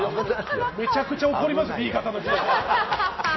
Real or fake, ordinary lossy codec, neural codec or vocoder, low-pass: real; MP3, 24 kbps; none; 7.2 kHz